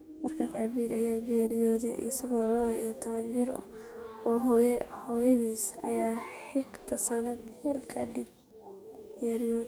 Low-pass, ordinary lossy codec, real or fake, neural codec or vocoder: none; none; fake; codec, 44.1 kHz, 2.6 kbps, DAC